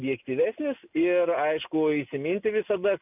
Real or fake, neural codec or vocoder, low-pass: real; none; 3.6 kHz